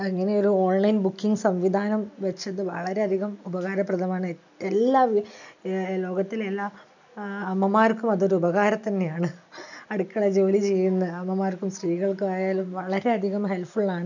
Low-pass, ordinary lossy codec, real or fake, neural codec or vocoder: 7.2 kHz; none; real; none